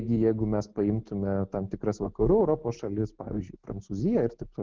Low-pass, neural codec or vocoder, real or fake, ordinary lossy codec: 7.2 kHz; none; real; Opus, 16 kbps